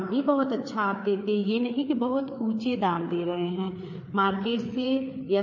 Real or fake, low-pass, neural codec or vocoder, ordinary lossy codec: fake; 7.2 kHz; codec, 16 kHz, 4 kbps, FreqCodec, larger model; MP3, 32 kbps